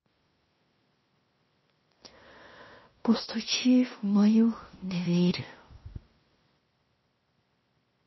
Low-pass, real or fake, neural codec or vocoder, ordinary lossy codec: 7.2 kHz; fake; codec, 16 kHz in and 24 kHz out, 0.9 kbps, LongCat-Audio-Codec, fine tuned four codebook decoder; MP3, 24 kbps